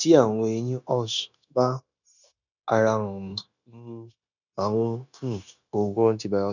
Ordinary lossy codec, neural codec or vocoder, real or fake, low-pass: none; codec, 16 kHz, 0.9 kbps, LongCat-Audio-Codec; fake; 7.2 kHz